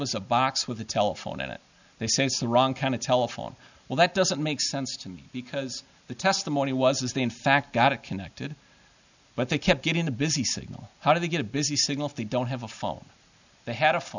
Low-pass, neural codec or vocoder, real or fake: 7.2 kHz; none; real